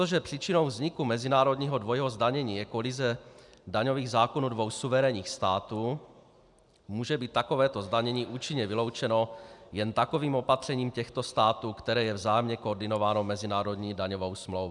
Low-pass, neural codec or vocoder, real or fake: 10.8 kHz; none; real